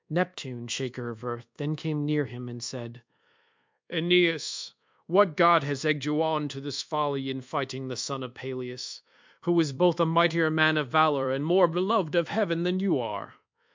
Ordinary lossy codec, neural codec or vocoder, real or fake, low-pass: MP3, 64 kbps; codec, 24 kHz, 1.2 kbps, DualCodec; fake; 7.2 kHz